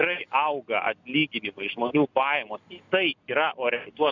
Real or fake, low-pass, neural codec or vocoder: real; 7.2 kHz; none